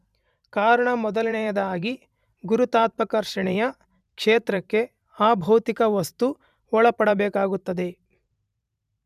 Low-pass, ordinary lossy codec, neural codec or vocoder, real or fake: 14.4 kHz; none; vocoder, 44.1 kHz, 128 mel bands every 512 samples, BigVGAN v2; fake